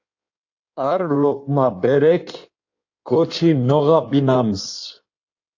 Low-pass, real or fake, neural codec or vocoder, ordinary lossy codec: 7.2 kHz; fake; codec, 16 kHz in and 24 kHz out, 1.1 kbps, FireRedTTS-2 codec; AAC, 48 kbps